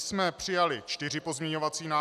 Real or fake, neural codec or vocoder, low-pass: real; none; 14.4 kHz